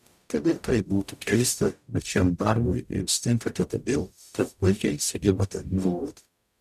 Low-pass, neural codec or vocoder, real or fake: 14.4 kHz; codec, 44.1 kHz, 0.9 kbps, DAC; fake